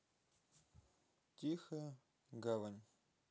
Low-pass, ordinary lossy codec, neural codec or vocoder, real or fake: none; none; none; real